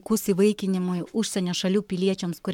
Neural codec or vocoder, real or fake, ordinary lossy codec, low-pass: codec, 44.1 kHz, 7.8 kbps, Pupu-Codec; fake; MP3, 96 kbps; 19.8 kHz